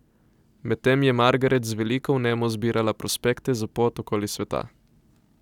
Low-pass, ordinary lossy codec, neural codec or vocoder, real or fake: 19.8 kHz; none; none; real